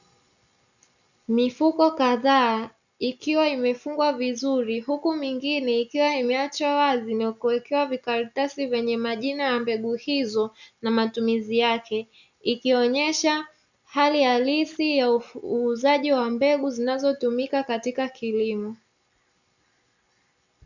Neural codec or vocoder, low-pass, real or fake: none; 7.2 kHz; real